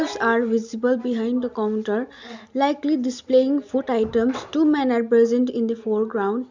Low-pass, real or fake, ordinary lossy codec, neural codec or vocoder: 7.2 kHz; real; MP3, 64 kbps; none